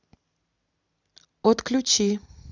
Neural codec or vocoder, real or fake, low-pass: none; real; 7.2 kHz